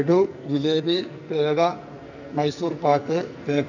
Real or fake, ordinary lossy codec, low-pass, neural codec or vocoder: fake; none; 7.2 kHz; codec, 44.1 kHz, 2.6 kbps, SNAC